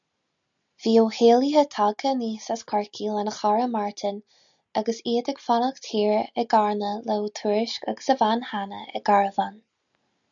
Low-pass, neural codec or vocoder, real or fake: 7.2 kHz; none; real